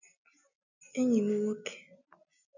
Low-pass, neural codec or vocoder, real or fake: 7.2 kHz; none; real